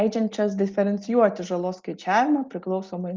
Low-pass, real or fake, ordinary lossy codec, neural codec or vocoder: 7.2 kHz; real; Opus, 32 kbps; none